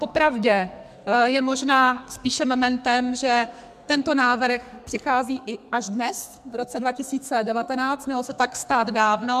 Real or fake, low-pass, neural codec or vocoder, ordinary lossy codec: fake; 14.4 kHz; codec, 44.1 kHz, 2.6 kbps, SNAC; MP3, 96 kbps